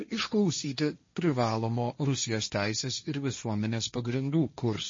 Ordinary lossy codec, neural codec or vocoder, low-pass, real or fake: MP3, 32 kbps; codec, 16 kHz, 1.1 kbps, Voila-Tokenizer; 7.2 kHz; fake